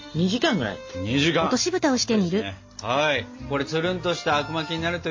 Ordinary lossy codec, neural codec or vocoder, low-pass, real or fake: MP3, 32 kbps; none; 7.2 kHz; real